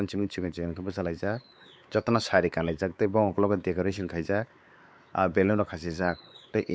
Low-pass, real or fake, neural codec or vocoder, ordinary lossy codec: none; fake; codec, 16 kHz, 4 kbps, X-Codec, WavLM features, trained on Multilingual LibriSpeech; none